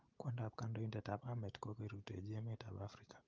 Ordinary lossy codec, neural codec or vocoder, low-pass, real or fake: Opus, 32 kbps; none; 7.2 kHz; real